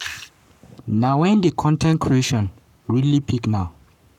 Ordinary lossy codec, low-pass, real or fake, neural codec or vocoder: none; 19.8 kHz; fake; codec, 44.1 kHz, 7.8 kbps, Pupu-Codec